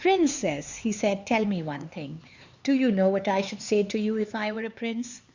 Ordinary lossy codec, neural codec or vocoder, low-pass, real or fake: Opus, 64 kbps; codec, 16 kHz, 4 kbps, X-Codec, HuBERT features, trained on LibriSpeech; 7.2 kHz; fake